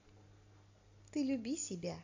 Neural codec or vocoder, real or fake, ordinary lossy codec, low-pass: none; real; AAC, 48 kbps; 7.2 kHz